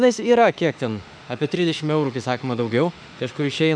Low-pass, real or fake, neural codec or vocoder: 9.9 kHz; fake; autoencoder, 48 kHz, 32 numbers a frame, DAC-VAE, trained on Japanese speech